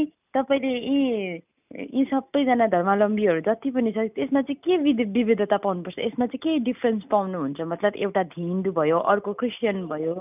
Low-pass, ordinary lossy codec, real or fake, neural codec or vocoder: 3.6 kHz; none; real; none